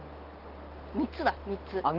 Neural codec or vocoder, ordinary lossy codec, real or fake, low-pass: none; Opus, 16 kbps; real; 5.4 kHz